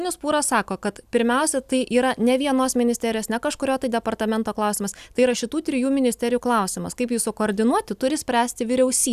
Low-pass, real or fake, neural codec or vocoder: 14.4 kHz; real; none